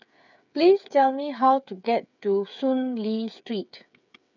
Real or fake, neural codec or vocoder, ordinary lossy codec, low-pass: fake; codec, 16 kHz, 8 kbps, FreqCodec, smaller model; none; 7.2 kHz